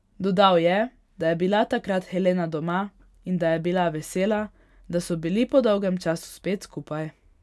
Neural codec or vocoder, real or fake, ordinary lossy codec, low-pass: none; real; none; none